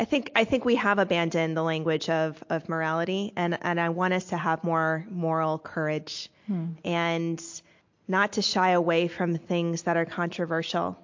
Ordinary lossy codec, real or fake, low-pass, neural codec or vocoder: MP3, 48 kbps; real; 7.2 kHz; none